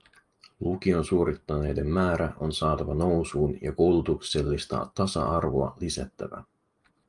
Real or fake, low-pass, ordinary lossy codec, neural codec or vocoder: real; 10.8 kHz; Opus, 32 kbps; none